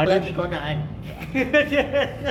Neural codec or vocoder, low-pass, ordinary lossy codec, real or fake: codec, 44.1 kHz, 7.8 kbps, Pupu-Codec; 19.8 kHz; none; fake